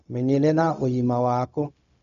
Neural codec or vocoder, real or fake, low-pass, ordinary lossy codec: codec, 16 kHz, 0.4 kbps, LongCat-Audio-Codec; fake; 7.2 kHz; none